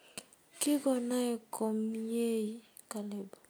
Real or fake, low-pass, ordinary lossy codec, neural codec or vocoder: real; none; none; none